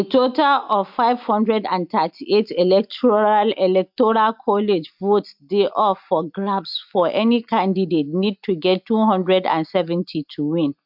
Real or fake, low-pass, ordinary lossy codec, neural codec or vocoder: real; 5.4 kHz; MP3, 48 kbps; none